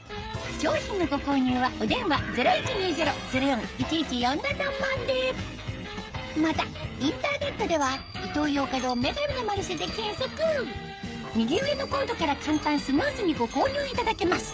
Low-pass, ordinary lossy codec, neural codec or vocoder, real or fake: none; none; codec, 16 kHz, 16 kbps, FreqCodec, smaller model; fake